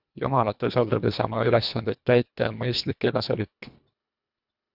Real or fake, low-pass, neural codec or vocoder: fake; 5.4 kHz; codec, 24 kHz, 1.5 kbps, HILCodec